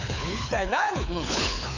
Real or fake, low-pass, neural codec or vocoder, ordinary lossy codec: fake; 7.2 kHz; codec, 24 kHz, 6 kbps, HILCodec; none